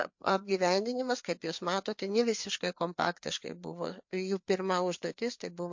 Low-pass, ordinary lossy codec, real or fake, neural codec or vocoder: 7.2 kHz; MP3, 48 kbps; fake; codec, 16 kHz, 4 kbps, FreqCodec, larger model